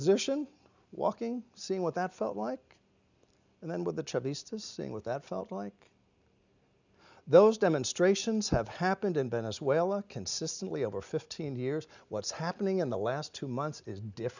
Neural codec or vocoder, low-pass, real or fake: none; 7.2 kHz; real